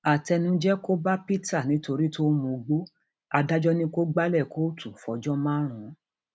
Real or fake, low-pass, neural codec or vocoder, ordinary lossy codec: real; none; none; none